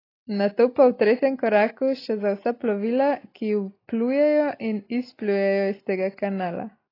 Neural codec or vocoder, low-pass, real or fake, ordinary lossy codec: none; 5.4 kHz; real; AAC, 24 kbps